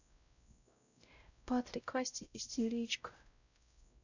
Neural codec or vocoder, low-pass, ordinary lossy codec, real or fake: codec, 16 kHz, 0.5 kbps, X-Codec, WavLM features, trained on Multilingual LibriSpeech; 7.2 kHz; none; fake